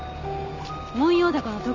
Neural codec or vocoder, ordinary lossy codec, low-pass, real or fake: none; Opus, 32 kbps; 7.2 kHz; real